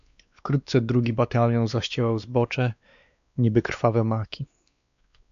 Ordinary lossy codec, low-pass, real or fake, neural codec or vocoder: AAC, 96 kbps; 7.2 kHz; fake; codec, 16 kHz, 2 kbps, X-Codec, WavLM features, trained on Multilingual LibriSpeech